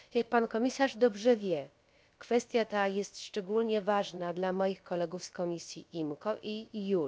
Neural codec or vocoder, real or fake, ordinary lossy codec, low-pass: codec, 16 kHz, about 1 kbps, DyCAST, with the encoder's durations; fake; none; none